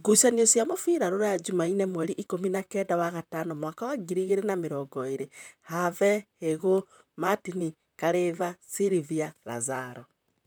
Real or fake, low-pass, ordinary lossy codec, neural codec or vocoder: fake; none; none; vocoder, 44.1 kHz, 128 mel bands, Pupu-Vocoder